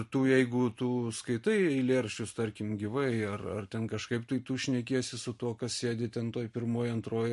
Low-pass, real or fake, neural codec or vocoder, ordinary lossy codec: 14.4 kHz; fake; vocoder, 44.1 kHz, 128 mel bands every 512 samples, BigVGAN v2; MP3, 48 kbps